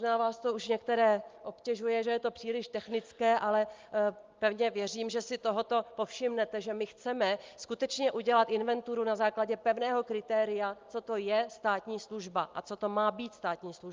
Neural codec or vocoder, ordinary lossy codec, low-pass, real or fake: none; Opus, 24 kbps; 7.2 kHz; real